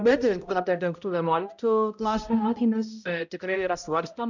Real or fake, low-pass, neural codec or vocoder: fake; 7.2 kHz; codec, 16 kHz, 0.5 kbps, X-Codec, HuBERT features, trained on balanced general audio